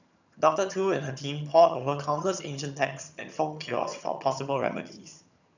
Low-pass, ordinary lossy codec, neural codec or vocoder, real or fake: 7.2 kHz; none; vocoder, 22.05 kHz, 80 mel bands, HiFi-GAN; fake